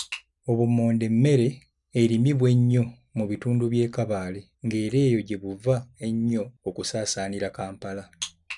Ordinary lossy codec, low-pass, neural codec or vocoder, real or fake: none; 10.8 kHz; none; real